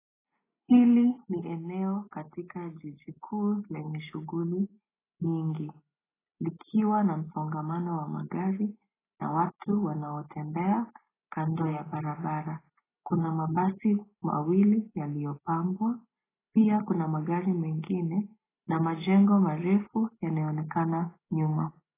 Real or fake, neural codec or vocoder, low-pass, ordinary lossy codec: real; none; 3.6 kHz; AAC, 16 kbps